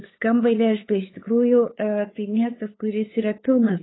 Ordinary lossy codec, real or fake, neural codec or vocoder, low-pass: AAC, 16 kbps; fake; codec, 16 kHz, 8 kbps, FunCodec, trained on LibriTTS, 25 frames a second; 7.2 kHz